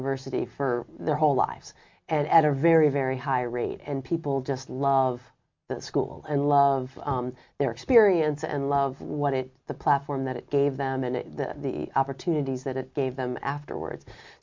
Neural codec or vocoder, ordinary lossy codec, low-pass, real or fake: none; MP3, 48 kbps; 7.2 kHz; real